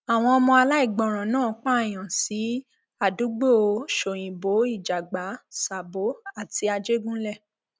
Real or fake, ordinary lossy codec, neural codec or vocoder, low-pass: real; none; none; none